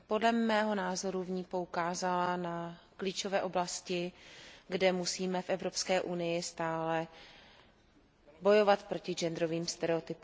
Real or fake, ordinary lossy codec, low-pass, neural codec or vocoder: real; none; none; none